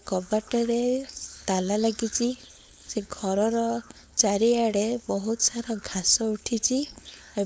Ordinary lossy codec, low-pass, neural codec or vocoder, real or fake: none; none; codec, 16 kHz, 4.8 kbps, FACodec; fake